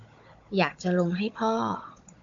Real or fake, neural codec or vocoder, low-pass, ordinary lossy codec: fake; codec, 16 kHz, 16 kbps, FunCodec, trained on Chinese and English, 50 frames a second; 7.2 kHz; AAC, 64 kbps